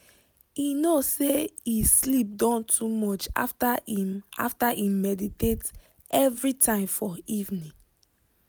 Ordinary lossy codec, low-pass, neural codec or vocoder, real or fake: none; none; none; real